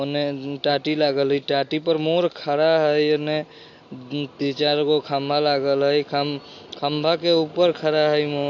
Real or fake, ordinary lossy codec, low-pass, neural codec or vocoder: real; AAC, 48 kbps; 7.2 kHz; none